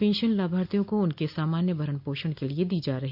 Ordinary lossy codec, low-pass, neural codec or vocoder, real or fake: none; 5.4 kHz; none; real